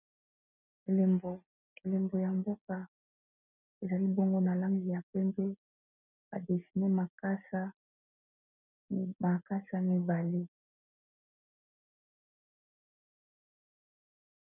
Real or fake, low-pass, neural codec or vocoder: real; 3.6 kHz; none